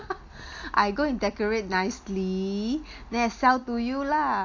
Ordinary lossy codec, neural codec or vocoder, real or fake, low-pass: AAC, 48 kbps; none; real; 7.2 kHz